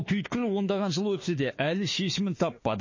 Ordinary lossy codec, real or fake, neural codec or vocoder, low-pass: MP3, 32 kbps; fake; autoencoder, 48 kHz, 32 numbers a frame, DAC-VAE, trained on Japanese speech; 7.2 kHz